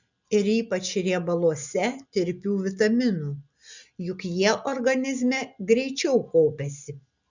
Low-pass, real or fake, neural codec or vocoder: 7.2 kHz; real; none